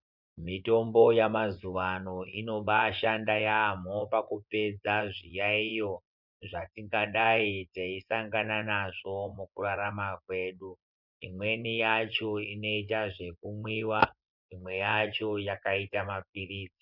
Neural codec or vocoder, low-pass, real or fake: vocoder, 24 kHz, 100 mel bands, Vocos; 5.4 kHz; fake